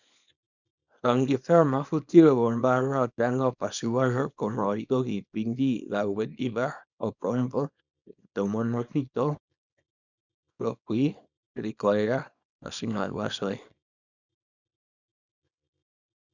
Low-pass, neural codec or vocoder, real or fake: 7.2 kHz; codec, 24 kHz, 0.9 kbps, WavTokenizer, small release; fake